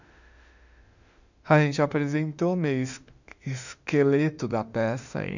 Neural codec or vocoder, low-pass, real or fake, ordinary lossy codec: autoencoder, 48 kHz, 32 numbers a frame, DAC-VAE, trained on Japanese speech; 7.2 kHz; fake; none